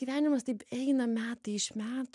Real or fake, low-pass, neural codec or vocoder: real; 10.8 kHz; none